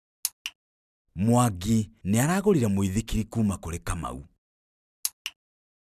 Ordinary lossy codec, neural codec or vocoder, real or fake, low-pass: none; none; real; 14.4 kHz